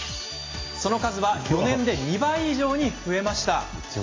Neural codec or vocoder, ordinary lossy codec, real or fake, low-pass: none; AAC, 32 kbps; real; 7.2 kHz